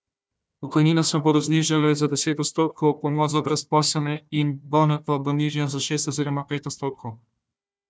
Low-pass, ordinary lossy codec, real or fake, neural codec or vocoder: none; none; fake; codec, 16 kHz, 1 kbps, FunCodec, trained on Chinese and English, 50 frames a second